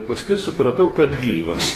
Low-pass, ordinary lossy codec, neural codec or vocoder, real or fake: 14.4 kHz; AAC, 48 kbps; codec, 44.1 kHz, 2.6 kbps, DAC; fake